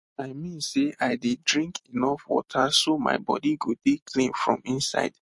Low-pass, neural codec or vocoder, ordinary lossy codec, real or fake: 10.8 kHz; none; MP3, 48 kbps; real